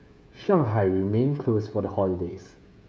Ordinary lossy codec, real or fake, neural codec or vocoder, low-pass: none; fake; codec, 16 kHz, 16 kbps, FreqCodec, smaller model; none